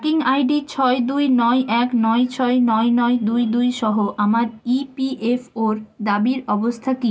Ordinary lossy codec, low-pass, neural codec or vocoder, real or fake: none; none; none; real